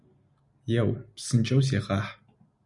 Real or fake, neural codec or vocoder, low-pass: real; none; 10.8 kHz